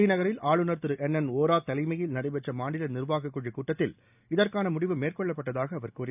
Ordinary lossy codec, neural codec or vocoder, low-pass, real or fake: none; none; 3.6 kHz; real